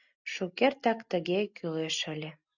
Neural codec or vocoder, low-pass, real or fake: none; 7.2 kHz; real